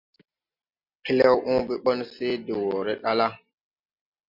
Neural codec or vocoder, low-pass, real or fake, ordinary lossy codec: none; 5.4 kHz; real; MP3, 48 kbps